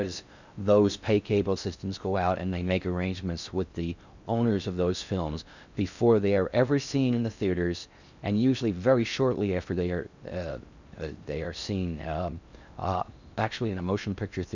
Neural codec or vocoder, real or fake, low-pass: codec, 16 kHz in and 24 kHz out, 0.6 kbps, FocalCodec, streaming, 4096 codes; fake; 7.2 kHz